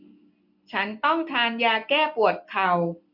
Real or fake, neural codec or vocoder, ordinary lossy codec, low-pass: real; none; none; 5.4 kHz